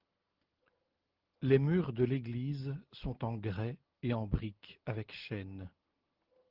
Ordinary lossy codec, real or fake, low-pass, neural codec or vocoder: Opus, 16 kbps; real; 5.4 kHz; none